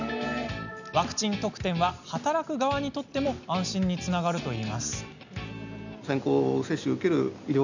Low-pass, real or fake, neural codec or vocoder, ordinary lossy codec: 7.2 kHz; real; none; none